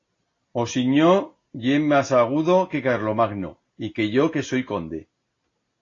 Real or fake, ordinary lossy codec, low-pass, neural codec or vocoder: real; AAC, 32 kbps; 7.2 kHz; none